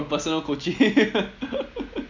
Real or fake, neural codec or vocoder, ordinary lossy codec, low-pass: real; none; MP3, 64 kbps; 7.2 kHz